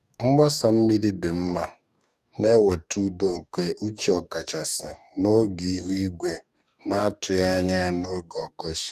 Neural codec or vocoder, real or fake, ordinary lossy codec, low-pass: codec, 44.1 kHz, 2.6 kbps, DAC; fake; none; 14.4 kHz